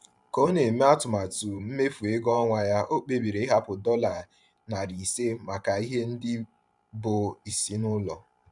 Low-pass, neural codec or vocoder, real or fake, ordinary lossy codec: 10.8 kHz; vocoder, 44.1 kHz, 128 mel bands every 512 samples, BigVGAN v2; fake; none